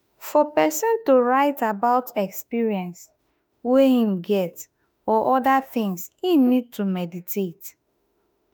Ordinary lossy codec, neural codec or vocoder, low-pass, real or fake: none; autoencoder, 48 kHz, 32 numbers a frame, DAC-VAE, trained on Japanese speech; none; fake